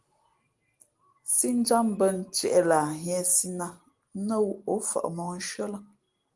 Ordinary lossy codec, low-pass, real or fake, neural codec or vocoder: Opus, 24 kbps; 10.8 kHz; real; none